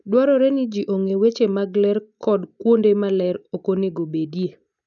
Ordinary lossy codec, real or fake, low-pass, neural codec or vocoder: none; real; 7.2 kHz; none